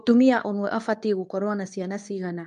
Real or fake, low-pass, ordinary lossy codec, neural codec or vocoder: fake; 10.8 kHz; MP3, 64 kbps; codec, 24 kHz, 0.9 kbps, WavTokenizer, medium speech release version 2